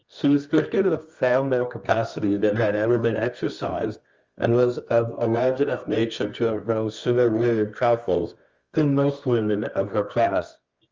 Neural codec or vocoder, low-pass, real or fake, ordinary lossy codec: codec, 24 kHz, 0.9 kbps, WavTokenizer, medium music audio release; 7.2 kHz; fake; Opus, 32 kbps